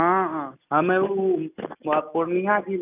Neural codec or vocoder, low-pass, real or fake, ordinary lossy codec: none; 3.6 kHz; real; none